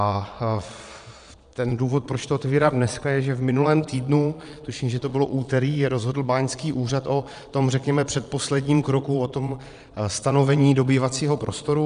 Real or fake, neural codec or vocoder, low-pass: fake; vocoder, 22.05 kHz, 80 mel bands, Vocos; 9.9 kHz